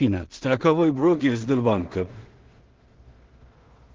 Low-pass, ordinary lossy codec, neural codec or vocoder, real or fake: 7.2 kHz; Opus, 16 kbps; codec, 16 kHz in and 24 kHz out, 0.4 kbps, LongCat-Audio-Codec, two codebook decoder; fake